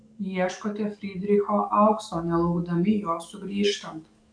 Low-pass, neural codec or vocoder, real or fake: 9.9 kHz; codec, 44.1 kHz, 7.8 kbps, DAC; fake